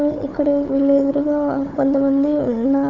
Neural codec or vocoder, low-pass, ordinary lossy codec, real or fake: codec, 16 kHz, 4 kbps, FunCodec, trained on Chinese and English, 50 frames a second; 7.2 kHz; none; fake